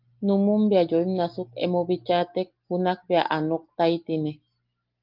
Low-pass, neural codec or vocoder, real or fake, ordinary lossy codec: 5.4 kHz; none; real; Opus, 32 kbps